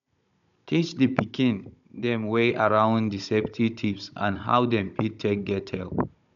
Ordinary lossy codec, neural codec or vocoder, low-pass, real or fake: none; codec, 16 kHz, 16 kbps, FunCodec, trained on Chinese and English, 50 frames a second; 7.2 kHz; fake